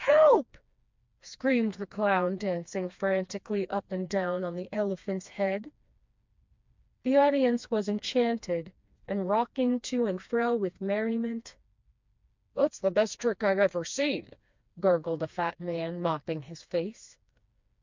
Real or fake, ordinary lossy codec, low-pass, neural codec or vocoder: fake; MP3, 64 kbps; 7.2 kHz; codec, 16 kHz, 2 kbps, FreqCodec, smaller model